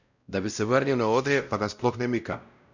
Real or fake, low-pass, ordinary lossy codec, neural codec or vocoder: fake; 7.2 kHz; none; codec, 16 kHz, 0.5 kbps, X-Codec, WavLM features, trained on Multilingual LibriSpeech